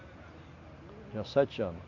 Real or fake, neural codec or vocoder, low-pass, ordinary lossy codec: real; none; 7.2 kHz; AAC, 48 kbps